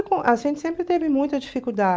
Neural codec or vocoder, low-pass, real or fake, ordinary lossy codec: none; none; real; none